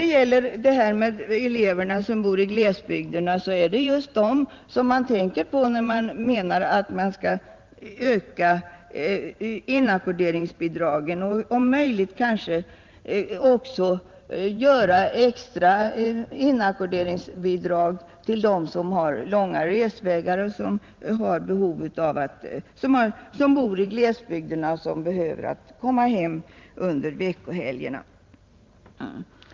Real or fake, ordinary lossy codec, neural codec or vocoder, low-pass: fake; Opus, 32 kbps; vocoder, 44.1 kHz, 128 mel bands every 512 samples, BigVGAN v2; 7.2 kHz